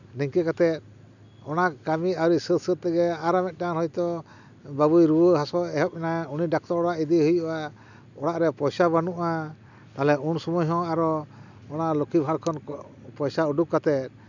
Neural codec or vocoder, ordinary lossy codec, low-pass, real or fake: none; none; 7.2 kHz; real